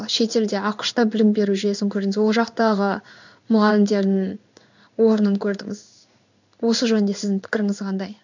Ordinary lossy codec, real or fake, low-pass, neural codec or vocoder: none; fake; 7.2 kHz; codec, 16 kHz in and 24 kHz out, 1 kbps, XY-Tokenizer